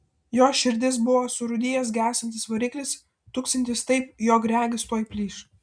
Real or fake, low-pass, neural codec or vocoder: real; 9.9 kHz; none